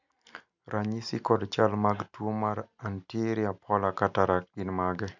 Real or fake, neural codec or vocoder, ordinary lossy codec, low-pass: real; none; none; 7.2 kHz